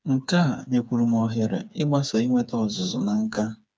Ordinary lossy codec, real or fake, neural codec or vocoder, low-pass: none; fake; codec, 16 kHz, 4 kbps, FreqCodec, smaller model; none